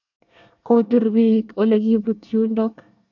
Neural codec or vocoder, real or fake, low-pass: codec, 24 kHz, 1 kbps, SNAC; fake; 7.2 kHz